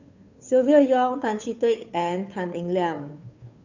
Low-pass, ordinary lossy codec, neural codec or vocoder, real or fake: 7.2 kHz; none; codec, 16 kHz, 2 kbps, FunCodec, trained on Chinese and English, 25 frames a second; fake